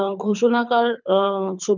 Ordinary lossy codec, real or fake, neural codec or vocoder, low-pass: none; fake; codec, 24 kHz, 6 kbps, HILCodec; 7.2 kHz